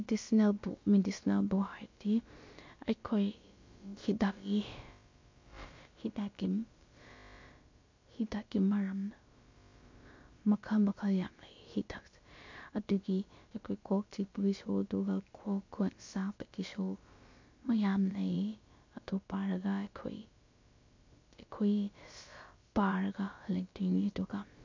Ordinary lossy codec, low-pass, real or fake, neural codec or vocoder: MP3, 48 kbps; 7.2 kHz; fake; codec, 16 kHz, about 1 kbps, DyCAST, with the encoder's durations